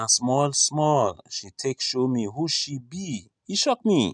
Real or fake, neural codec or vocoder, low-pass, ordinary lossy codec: real; none; 9.9 kHz; none